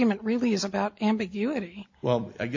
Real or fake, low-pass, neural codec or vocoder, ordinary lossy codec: real; 7.2 kHz; none; MP3, 48 kbps